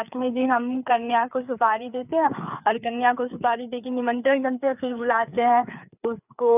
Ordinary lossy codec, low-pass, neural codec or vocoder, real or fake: none; 3.6 kHz; codec, 16 kHz, 4 kbps, FreqCodec, larger model; fake